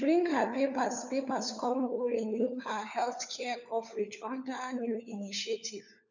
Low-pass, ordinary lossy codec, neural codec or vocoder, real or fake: 7.2 kHz; none; codec, 16 kHz, 4 kbps, FunCodec, trained on LibriTTS, 50 frames a second; fake